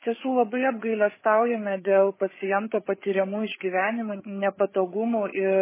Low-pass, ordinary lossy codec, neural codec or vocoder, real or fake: 3.6 kHz; MP3, 16 kbps; codec, 16 kHz, 8 kbps, FreqCodec, larger model; fake